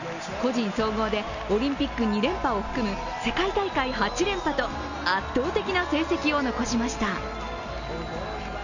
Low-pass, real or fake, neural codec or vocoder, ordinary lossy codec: 7.2 kHz; real; none; none